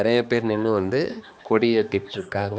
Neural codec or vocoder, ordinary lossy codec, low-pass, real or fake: codec, 16 kHz, 2 kbps, X-Codec, HuBERT features, trained on balanced general audio; none; none; fake